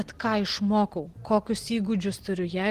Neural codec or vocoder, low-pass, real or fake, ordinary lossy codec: none; 14.4 kHz; real; Opus, 16 kbps